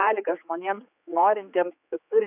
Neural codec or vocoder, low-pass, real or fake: codec, 16 kHz, 4 kbps, X-Codec, HuBERT features, trained on general audio; 3.6 kHz; fake